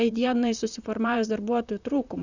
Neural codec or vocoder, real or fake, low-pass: vocoder, 44.1 kHz, 128 mel bands, Pupu-Vocoder; fake; 7.2 kHz